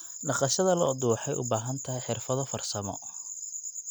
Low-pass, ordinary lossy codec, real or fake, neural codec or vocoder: none; none; real; none